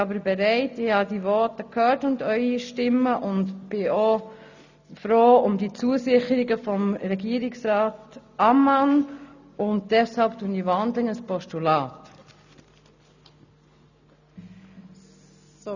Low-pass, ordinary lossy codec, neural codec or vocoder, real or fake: 7.2 kHz; none; none; real